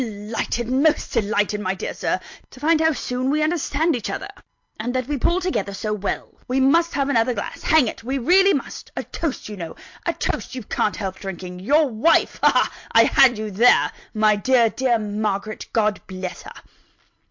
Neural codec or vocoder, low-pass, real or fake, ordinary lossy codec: none; 7.2 kHz; real; MP3, 48 kbps